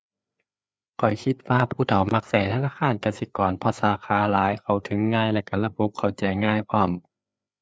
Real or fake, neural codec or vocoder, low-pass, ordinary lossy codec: fake; codec, 16 kHz, 4 kbps, FreqCodec, larger model; none; none